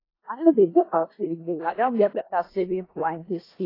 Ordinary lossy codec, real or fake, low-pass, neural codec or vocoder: AAC, 24 kbps; fake; 5.4 kHz; codec, 16 kHz in and 24 kHz out, 0.4 kbps, LongCat-Audio-Codec, four codebook decoder